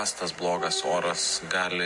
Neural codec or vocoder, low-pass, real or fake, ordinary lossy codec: none; 10.8 kHz; real; MP3, 48 kbps